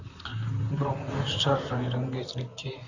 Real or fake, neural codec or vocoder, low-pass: fake; vocoder, 44.1 kHz, 128 mel bands, Pupu-Vocoder; 7.2 kHz